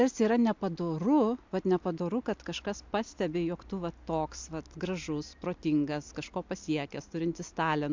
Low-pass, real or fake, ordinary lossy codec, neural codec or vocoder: 7.2 kHz; real; MP3, 64 kbps; none